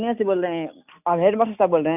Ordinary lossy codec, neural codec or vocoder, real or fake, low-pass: none; none; real; 3.6 kHz